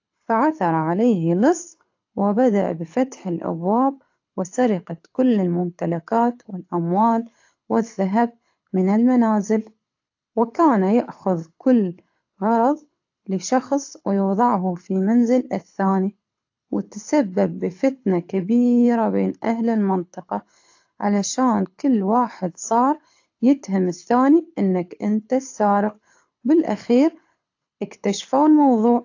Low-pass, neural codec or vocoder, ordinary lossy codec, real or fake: 7.2 kHz; codec, 24 kHz, 6 kbps, HILCodec; AAC, 48 kbps; fake